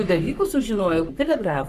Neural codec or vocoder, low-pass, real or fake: codec, 44.1 kHz, 3.4 kbps, Pupu-Codec; 14.4 kHz; fake